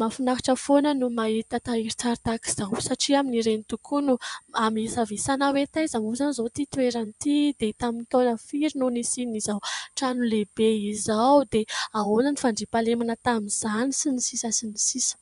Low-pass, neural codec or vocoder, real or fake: 10.8 kHz; vocoder, 24 kHz, 100 mel bands, Vocos; fake